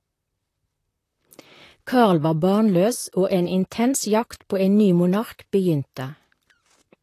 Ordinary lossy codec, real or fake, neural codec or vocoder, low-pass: AAC, 48 kbps; fake; vocoder, 44.1 kHz, 128 mel bands, Pupu-Vocoder; 14.4 kHz